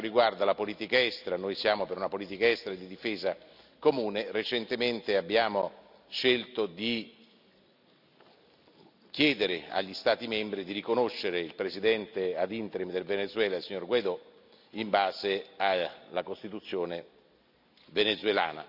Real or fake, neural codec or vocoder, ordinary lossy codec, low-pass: real; none; none; 5.4 kHz